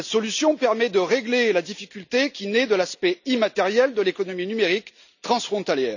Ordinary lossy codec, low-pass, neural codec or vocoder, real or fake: none; 7.2 kHz; none; real